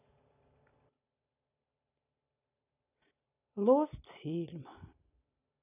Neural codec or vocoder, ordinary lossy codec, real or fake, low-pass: none; none; real; 3.6 kHz